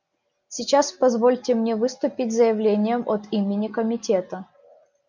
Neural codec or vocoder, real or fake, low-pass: none; real; 7.2 kHz